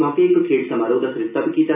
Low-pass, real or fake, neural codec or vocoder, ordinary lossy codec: 3.6 kHz; real; none; none